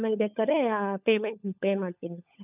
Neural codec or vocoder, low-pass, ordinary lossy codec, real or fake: codec, 16 kHz, 16 kbps, FunCodec, trained on LibriTTS, 50 frames a second; 3.6 kHz; none; fake